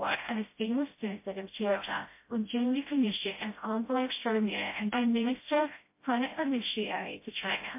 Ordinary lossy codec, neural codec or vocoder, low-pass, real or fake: MP3, 24 kbps; codec, 16 kHz, 0.5 kbps, FreqCodec, smaller model; 3.6 kHz; fake